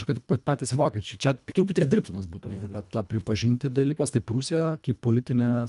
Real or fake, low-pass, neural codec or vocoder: fake; 10.8 kHz; codec, 24 kHz, 1.5 kbps, HILCodec